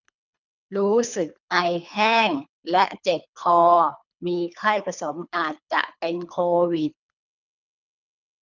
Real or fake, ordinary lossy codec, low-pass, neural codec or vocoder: fake; none; 7.2 kHz; codec, 24 kHz, 3 kbps, HILCodec